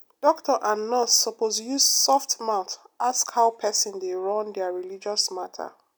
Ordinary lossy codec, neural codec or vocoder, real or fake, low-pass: none; none; real; none